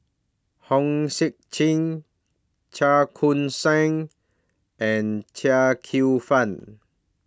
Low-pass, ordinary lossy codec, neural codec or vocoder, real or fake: none; none; none; real